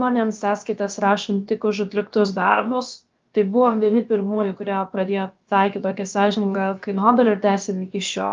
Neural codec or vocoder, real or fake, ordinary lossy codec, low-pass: codec, 16 kHz, about 1 kbps, DyCAST, with the encoder's durations; fake; Opus, 32 kbps; 7.2 kHz